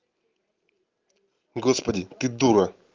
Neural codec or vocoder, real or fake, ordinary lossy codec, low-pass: none; real; Opus, 24 kbps; 7.2 kHz